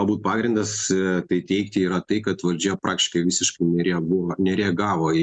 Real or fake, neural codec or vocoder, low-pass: real; none; 9.9 kHz